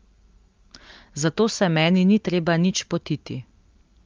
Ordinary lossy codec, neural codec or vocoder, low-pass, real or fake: Opus, 32 kbps; none; 7.2 kHz; real